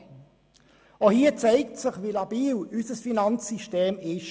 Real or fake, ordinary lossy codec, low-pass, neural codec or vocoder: real; none; none; none